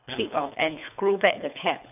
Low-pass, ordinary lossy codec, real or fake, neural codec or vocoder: 3.6 kHz; none; fake; codec, 24 kHz, 3 kbps, HILCodec